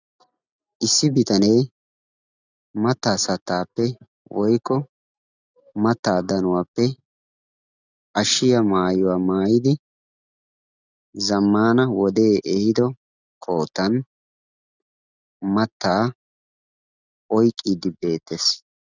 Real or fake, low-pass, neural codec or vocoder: real; 7.2 kHz; none